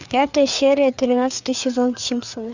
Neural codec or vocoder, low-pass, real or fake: codec, 16 kHz, 4 kbps, FreqCodec, larger model; 7.2 kHz; fake